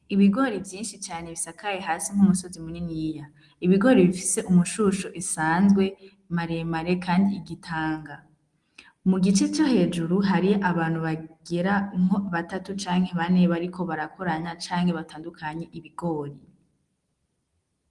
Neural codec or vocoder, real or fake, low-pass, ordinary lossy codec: none; real; 10.8 kHz; Opus, 24 kbps